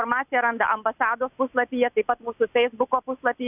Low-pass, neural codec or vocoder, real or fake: 3.6 kHz; none; real